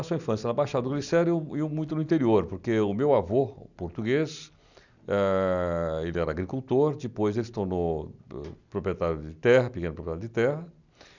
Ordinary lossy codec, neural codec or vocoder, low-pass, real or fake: none; none; 7.2 kHz; real